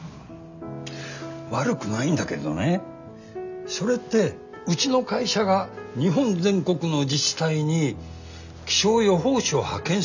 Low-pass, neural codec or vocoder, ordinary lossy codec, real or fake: 7.2 kHz; none; none; real